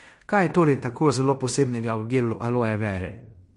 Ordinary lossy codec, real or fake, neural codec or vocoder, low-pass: MP3, 48 kbps; fake; codec, 16 kHz in and 24 kHz out, 0.9 kbps, LongCat-Audio-Codec, fine tuned four codebook decoder; 10.8 kHz